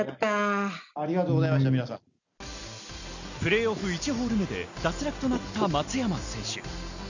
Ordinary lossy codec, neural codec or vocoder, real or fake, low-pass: none; none; real; 7.2 kHz